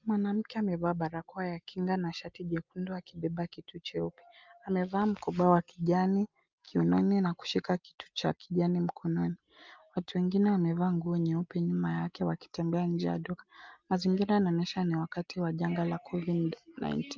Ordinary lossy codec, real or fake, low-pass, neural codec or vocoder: Opus, 24 kbps; real; 7.2 kHz; none